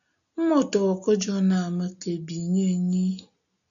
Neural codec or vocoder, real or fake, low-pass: none; real; 7.2 kHz